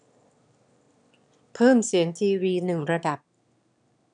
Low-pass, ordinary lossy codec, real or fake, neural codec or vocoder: 9.9 kHz; none; fake; autoencoder, 22.05 kHz, a latent of 192 numbers a frame, VITS, trained on one speaker